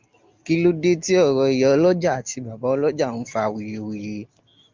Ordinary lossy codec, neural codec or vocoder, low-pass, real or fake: Opus, 24 kbps; none; 7.2 kHz; real